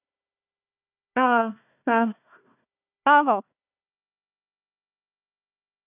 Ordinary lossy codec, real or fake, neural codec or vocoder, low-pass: none; fake; codec, 16 kHz, 1 kbps, FunCodec, trained on Chinese and English, 50 frames a second; 3.6 kHz